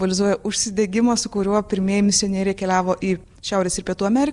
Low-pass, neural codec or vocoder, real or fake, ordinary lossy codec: 10.8 kHz; none; real; Opus, 64 kbps